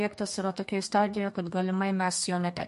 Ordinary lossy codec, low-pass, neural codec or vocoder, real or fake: MP3, 48 kbps; 14.4 kHz; codec, 32 kHz, 1.9 kbps, SNAC; fake